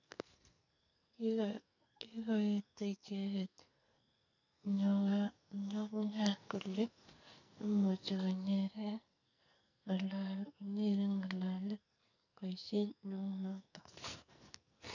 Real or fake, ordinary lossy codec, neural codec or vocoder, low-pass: fake; none; codec, 44.1 kHz, 2.6 kbps, SNAC; 7.2 kHz